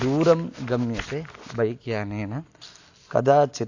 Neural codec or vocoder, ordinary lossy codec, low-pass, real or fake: none; AAC, 48 kbps; 7.2 kHz; real